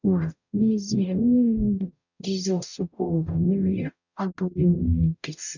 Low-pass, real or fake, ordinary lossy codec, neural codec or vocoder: 7.2 kHz; fake; none; codec, 44.1 kHz, 0.9 kbps, DAC